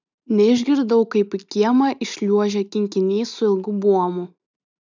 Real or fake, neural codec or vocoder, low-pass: real; none; 7.2 kHz